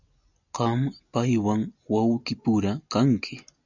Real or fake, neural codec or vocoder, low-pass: real; none; 7.2 kHz